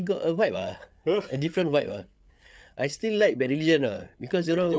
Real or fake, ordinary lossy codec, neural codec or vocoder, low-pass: fake; none; codec, 16 kHz, 8 kbps, FreqCodec, larger model; none